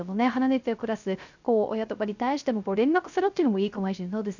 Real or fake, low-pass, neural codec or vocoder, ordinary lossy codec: fake; 7.2 kHz; codec, 16 kHz, 0.3 kbps, FocalCodec; none